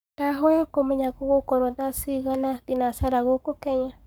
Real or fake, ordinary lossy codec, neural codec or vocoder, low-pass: fake; none; codec, 44.1 kHz, 7.8 kbps, Pupu-Codec; none